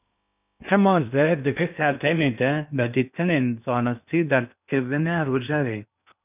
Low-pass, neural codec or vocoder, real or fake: 3.6 kHz; codec, 16 kHz in and 24 kHz out, 0.6 kbps, FocalCodec, streaming, 2048 codes; fake